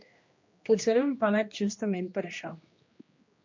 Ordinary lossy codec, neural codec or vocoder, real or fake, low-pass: MP3, 48 kbps; codec, 16 kHz, 1 kbps, X-Codec, HuBERT features, trained on general audio; fake; 7.2 kHz